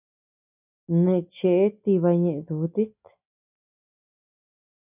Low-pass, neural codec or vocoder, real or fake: 3.6 kHz; none; real